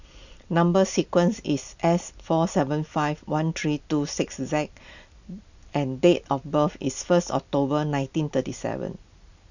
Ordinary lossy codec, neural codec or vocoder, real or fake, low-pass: none; none; real; 7.2 kHz